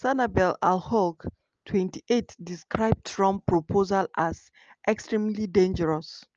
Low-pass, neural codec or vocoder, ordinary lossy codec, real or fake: 7.2 kHz; none; Opus, 24 kbps; real